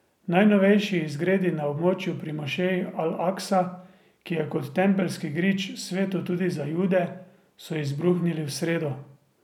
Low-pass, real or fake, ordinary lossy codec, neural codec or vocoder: 19.8 kHz; real; none; none